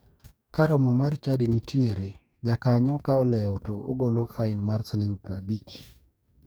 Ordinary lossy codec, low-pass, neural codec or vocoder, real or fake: none; none; codec, 44.1 kHz, 2.6 kbps, DAC; fake